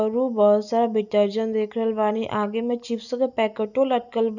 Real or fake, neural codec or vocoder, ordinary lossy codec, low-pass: real; none; none; 7.2 kHz